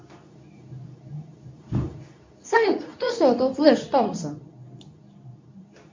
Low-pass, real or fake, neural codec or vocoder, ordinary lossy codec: 7.2 kHz; fake; codec, 24 kHz, 0.9 kbps, WavTokenizer, medium speech release version 2; MP3, 64 kbps